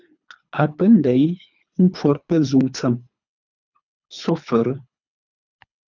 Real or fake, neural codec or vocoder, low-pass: fake; codec, 24 kHz, 3 kbps, HILCodec; 7.2 kHz